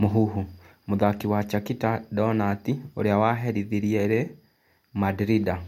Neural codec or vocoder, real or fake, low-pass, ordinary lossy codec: none; real; 19.8 kHz; MP3, 64 kbps